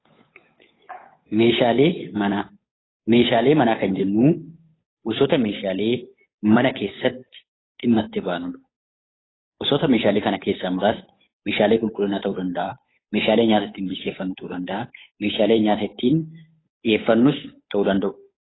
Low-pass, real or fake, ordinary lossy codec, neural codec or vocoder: 7.2 kHz; fake; AAC, 16 kbps; codec, 16 kHz, 8 kbps, FunCodec, trained on Chinese and English, 25 frames a second